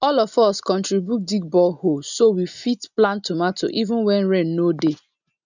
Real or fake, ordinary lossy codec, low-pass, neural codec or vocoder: real; none; 7.2 kHz; none